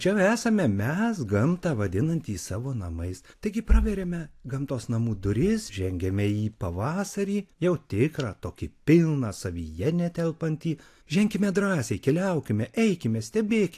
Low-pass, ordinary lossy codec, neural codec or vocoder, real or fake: 14.4 kHz; AAC, 64 kbps; none; real